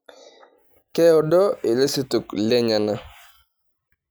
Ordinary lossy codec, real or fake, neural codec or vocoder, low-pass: none; real; none; none